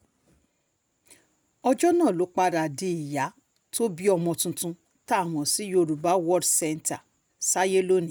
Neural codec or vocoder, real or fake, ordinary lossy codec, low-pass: none; real; none; none